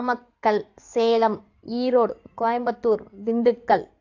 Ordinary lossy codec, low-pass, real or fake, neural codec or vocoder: AAC, 48 kbps; 7.2 kHz; fake; codec, 16 kHz in and 24 kHz out, 2.2 kbps, FireRedTTS-2 codec